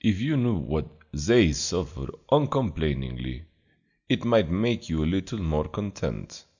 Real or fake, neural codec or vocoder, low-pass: real; none; 7.2 kHz